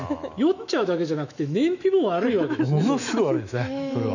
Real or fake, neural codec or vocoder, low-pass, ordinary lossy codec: fake; autoencoder, 48 kHz, 128 numbers a frame, DAC-VAE, trained on Japanese speech; 7.2 kHz; none